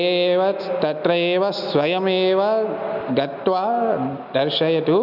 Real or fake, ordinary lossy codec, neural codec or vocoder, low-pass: real; none; none; 5.4 kHz